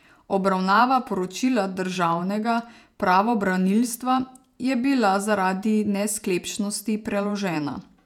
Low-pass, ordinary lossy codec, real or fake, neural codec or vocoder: 19.8 kHz; none; fake; vocoder, 48 kHz, 128 mel bands, Vocos